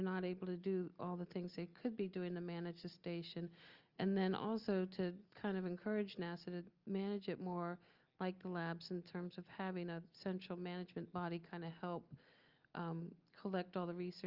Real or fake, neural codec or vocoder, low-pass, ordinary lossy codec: real; none; 5.4 kHz; Opus, 24 kbps